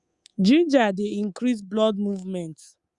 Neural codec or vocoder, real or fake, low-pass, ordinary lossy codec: codec, 24 kHz, 3.1 kbps, DualCodec; fake; 10.8 kHz; Opus, 64 kbps